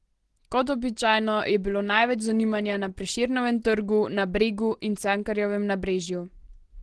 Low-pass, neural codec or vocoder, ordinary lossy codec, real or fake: 10.8 kHz; none; Opus, 16 kbps; real